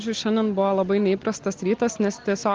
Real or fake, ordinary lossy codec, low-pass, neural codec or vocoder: real; Opus, 16 kbps; 7.2 kHz; none